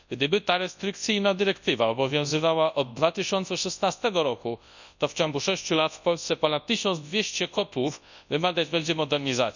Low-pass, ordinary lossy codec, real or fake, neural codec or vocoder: 7.2 kHz; none; fake; codec, 24 kHz, 0.9 kbps, WavTokenizer, large speech release